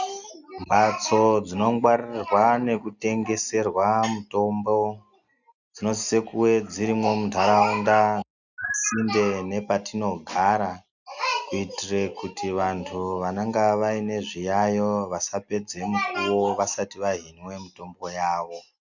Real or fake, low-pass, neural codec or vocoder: real; 7.2 kHz; none